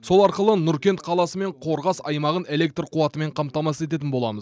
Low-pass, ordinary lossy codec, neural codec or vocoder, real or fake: none; none; none; real